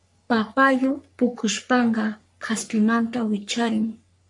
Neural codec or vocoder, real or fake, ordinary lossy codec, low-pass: codec, 44.1 kHz, 3.4 kbps, Pupu-Codec; fake; MP3, 64 kbps; 10.8 kHz